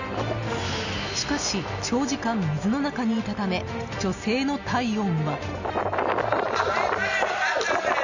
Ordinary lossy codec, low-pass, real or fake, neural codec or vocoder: none; 7.2 kHz; real; none